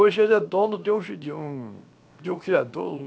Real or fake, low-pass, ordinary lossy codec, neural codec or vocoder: fake; none; none; codec, 16 kHz, 0.7 kbps, FocalCodec